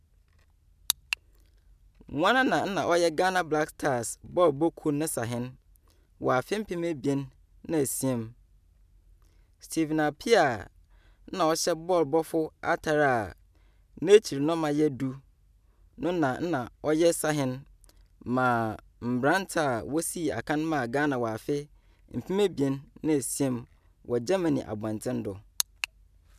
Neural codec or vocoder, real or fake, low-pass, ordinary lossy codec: none; real; 14.4 kHz; none